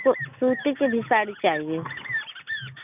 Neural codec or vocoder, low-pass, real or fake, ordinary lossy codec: none; 3.6 kHz; real; none